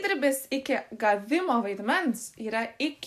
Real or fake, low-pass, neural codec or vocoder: real; 14.4 kHz; none